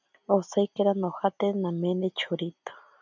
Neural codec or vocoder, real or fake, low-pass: none; real; 7.2 kHz